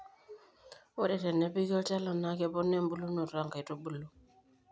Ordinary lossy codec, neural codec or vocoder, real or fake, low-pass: none; none; real; none